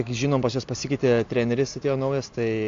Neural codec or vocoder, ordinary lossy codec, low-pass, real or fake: none; AAC, 48 kbps; 7.2 kHz; real